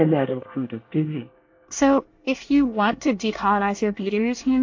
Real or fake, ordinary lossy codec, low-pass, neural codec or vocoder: fake; AAC, 48 kbps; 7.2 kHz; codec, 24 kHz, 1 kbps, SNAC